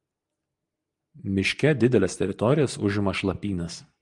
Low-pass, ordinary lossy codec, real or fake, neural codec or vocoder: 10.8 kHz; Opus, 32 kbps; real; none